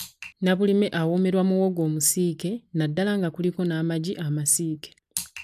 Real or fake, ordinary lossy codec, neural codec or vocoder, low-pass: real; none; none; 14.4 kHz